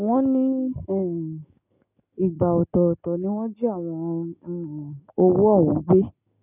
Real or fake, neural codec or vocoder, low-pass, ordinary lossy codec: real; none; 3.6 kHz; none